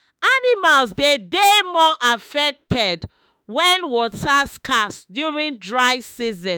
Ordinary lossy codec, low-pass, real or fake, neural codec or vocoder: none; none; fake; autoencoder, 48 kHz, 32 numbers a frame, DAC-VAE, trained on Japanese speech